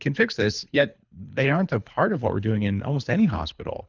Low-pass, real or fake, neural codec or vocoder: 7.2 kHz; fake; codec, 24 kHz, 3 kbps, HILCodec